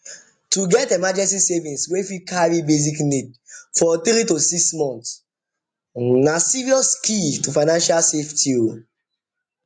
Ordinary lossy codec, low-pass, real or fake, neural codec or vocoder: AAC, 64 kbps; 9.9 kHz; real; none